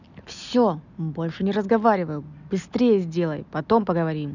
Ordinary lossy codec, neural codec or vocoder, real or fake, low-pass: none; none; real; 7.2 kHz